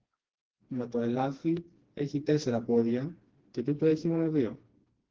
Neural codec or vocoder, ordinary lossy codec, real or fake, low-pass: codec, 16 kHz, 2 kbps, FreqCodec, smaller model; Opus, 16 kbps; fake; 7.2 kHz